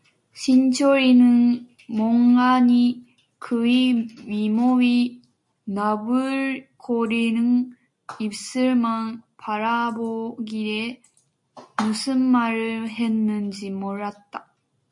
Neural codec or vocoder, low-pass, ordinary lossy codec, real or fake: none; 10.8 kHz; MP3, 48 kbps; real